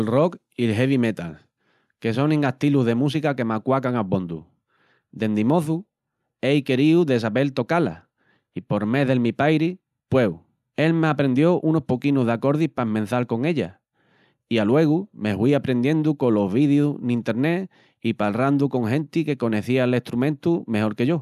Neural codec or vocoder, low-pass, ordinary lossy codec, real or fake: none; 14.4 kHz; none; real